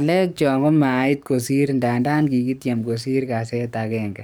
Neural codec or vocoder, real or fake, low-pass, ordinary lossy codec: codec, 44.1 kHz, 7.8 kbps, DAC; fake; none; none